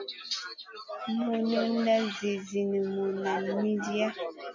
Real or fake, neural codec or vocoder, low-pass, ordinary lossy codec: real; none; 7.2 kHz; AAC, 48 kbps